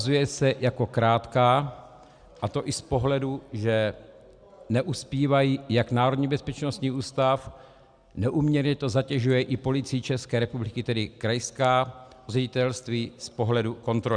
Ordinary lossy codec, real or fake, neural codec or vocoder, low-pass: Opus, 64 kbps; real; none; 9.9 kHz